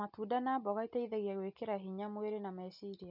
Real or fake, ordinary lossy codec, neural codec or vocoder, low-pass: real; none; none; 5.4 kHz